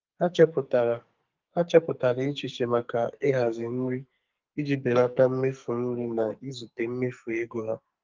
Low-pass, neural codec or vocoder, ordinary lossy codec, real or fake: 7.2 kHz; codec, 44.1 kHz, 2.6 kbps, SNAC; Opus, 32 kbps; fake